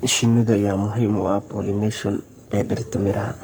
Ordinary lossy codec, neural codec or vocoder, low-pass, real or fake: none; codec, 44.1 kHz, 3.4 kbps, Pupu-Codec; none; fake